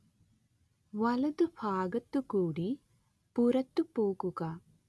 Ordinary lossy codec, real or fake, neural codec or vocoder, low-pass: none; real; none; none